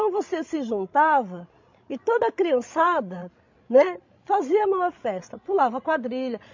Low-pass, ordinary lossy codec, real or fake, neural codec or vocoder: 7.2 kHz; MP3, 48 kbps; fake; codec, 16 kHz, 16 kbps, FreqCodec, larger model